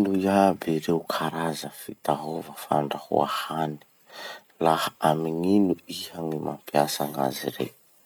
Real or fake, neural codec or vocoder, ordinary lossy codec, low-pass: real; none; none; none